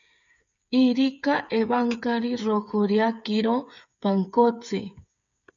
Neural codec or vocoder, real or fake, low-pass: codec, 16 kHz, 8 kbps, FreqCodec, smaller model; fake; 7.2 kHz